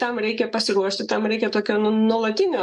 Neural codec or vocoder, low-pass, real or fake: codec, 44.1 kHz, 7.8 kbps, DAC; 10.8 kHz; fake